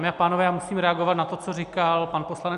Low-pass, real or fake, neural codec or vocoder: 10.8 kHz; real; none